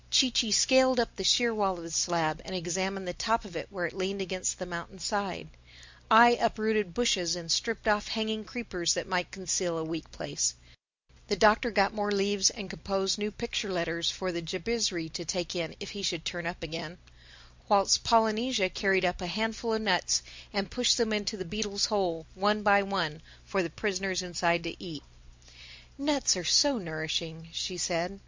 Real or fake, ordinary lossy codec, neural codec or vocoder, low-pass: real; MP3, 64 kbps; none; 7.2 kHz